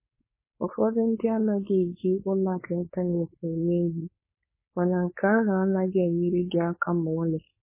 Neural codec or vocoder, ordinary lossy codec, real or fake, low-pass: codec, 16 kHz, 4.8 kbps, FACodec; MP3, 16 kbps; fake; 3.6 kHz